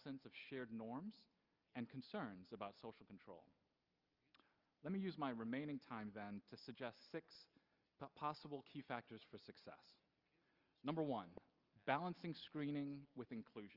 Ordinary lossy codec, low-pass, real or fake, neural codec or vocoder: Opus, 24 kbps; 5.4 kHz; real; none